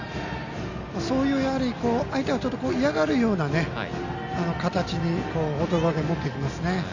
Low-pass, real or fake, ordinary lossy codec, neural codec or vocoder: 7.2 kHz; real; none; none